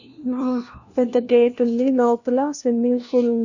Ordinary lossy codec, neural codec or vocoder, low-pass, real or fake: none; codec, 16 kHz, 1 kbps, FunCodec, trained on LibriTTS, 50 frames a second; 7.2 kHz; fake